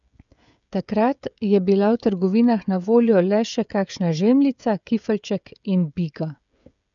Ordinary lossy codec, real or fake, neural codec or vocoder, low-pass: none; fake; codec, 16 kHz, 16 kbps, FreqCodec, smaller model; 7.2 kHz